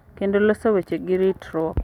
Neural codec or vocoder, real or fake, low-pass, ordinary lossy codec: none; real; 19.8 kHz; none